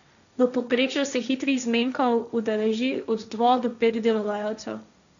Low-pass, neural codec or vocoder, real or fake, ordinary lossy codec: 7.2 kHz; codec, 16 kHz, 1.1 kbps, Voila-Tokenizer; fake; none